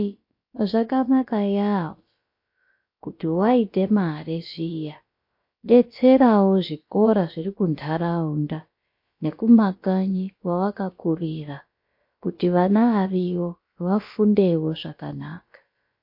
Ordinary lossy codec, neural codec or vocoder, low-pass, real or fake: MP3, 32 kbps; codec, 16 kHz, about 1 kbps, DyCAST, with the encoder's durations; 5.4 kHz; fake